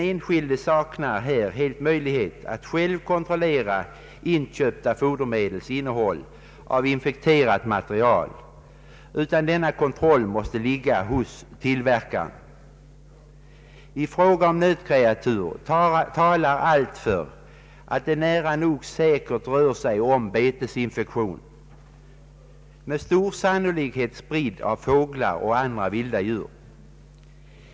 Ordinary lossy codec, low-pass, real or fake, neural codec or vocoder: none; none; real; none